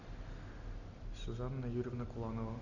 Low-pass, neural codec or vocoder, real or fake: 7.2 kHz; none; real